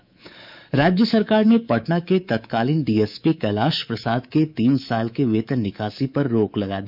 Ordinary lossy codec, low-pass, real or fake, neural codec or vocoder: none; 5.4 kHz; fake; codec, 24 kHz, 3.1 kbps, DualCodec